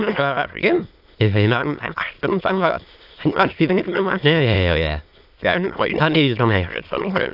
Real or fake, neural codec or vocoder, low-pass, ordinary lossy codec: fake; autoencoder, 22.05 kHz, a latent of 192 numbers a frame, VITS, trained on many speakers; 5.4 kHz; AAC, 48 kbps